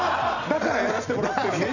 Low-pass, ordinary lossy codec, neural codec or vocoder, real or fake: 7.2 kHz; none; none; real